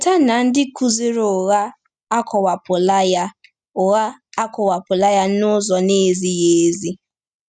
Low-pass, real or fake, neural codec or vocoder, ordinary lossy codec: 9.9 kHz; real; none; none